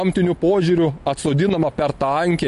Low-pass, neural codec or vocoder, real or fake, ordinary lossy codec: 14.4 kHz; none; real; MP3, 48 kbps